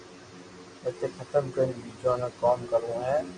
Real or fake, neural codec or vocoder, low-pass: real; none; 9.9 kHz